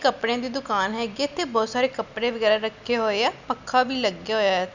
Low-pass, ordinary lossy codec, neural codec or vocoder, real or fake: 7.2 kHz; none; none; real